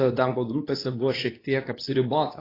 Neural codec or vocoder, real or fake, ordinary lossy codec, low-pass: codec, 24 kHz, 0.9 kbps, WavTokenizer, medium speech release version 2; fake; AAC, 24 kbps; 5.4 kHz